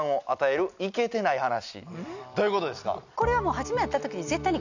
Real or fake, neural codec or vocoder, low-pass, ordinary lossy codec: real; none; 7.2 kHz; none